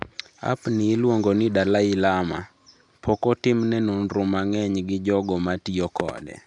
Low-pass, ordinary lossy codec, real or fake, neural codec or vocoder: 10.8 kHz; none; real; none